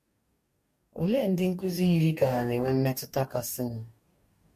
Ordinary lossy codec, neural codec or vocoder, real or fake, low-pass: MP3, 64 kbps; codec, 44.1 kHz, 2.6 kbps, DAC; fake; 14.4 kHz